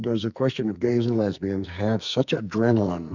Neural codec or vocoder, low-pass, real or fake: codec, 44.1 kHz, 2.6 kbps, SNAC; 7.2 kHz; fake